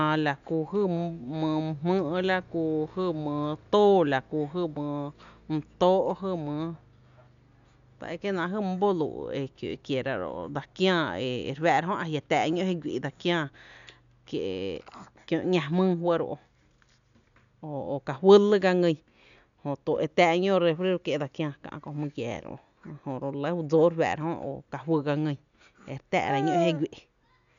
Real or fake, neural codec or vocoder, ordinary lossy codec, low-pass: real; none; none; 7.2 kHz